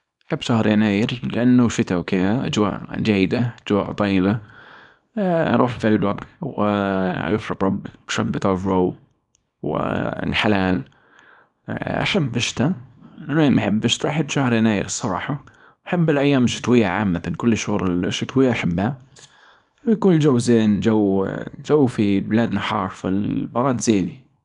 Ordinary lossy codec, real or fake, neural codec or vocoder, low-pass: none; fake; codec, 24 kHz, 0.9 kbps, WavTokenizer, small release; 10.8 kHz